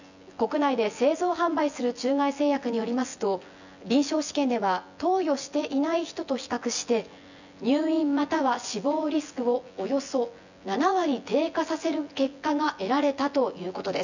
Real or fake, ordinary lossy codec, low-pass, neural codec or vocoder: fake; none; 7.2 kHz; vocoder, 24 kHz, 100 mel bands, Vocos